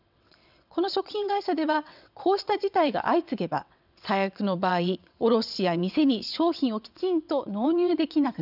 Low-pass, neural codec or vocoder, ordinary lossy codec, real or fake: 5.4 kHz; vocoder, 22.05 kHz, 80 mel bands, WaveNeXt; none; fake